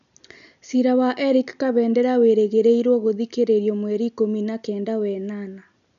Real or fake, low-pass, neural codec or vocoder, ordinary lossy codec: real; 7.2 kHz; none; none